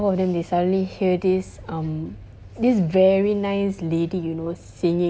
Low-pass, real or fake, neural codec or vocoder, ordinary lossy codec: none; real; none; none